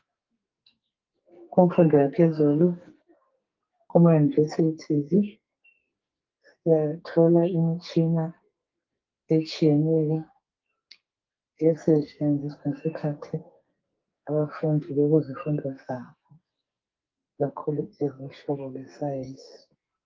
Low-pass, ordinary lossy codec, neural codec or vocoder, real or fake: 7.2 kHz; Opus, 24 kbps; codec, 44.1 kHz, 2.6 kbps, SNAC; fake